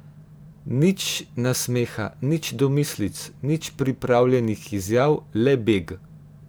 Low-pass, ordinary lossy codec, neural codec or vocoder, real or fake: none; none; vocoder, 44.1 kHz, 128 mel bands every 512 samples, BigVGAN v2; fake